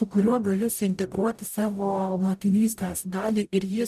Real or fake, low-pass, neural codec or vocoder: fake; 14.4 kHz; codec, 44.1 kHz, 0.9 kbps, DAC